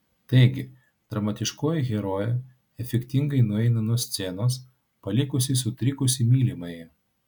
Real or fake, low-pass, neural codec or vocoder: real; 19.8 kHz; none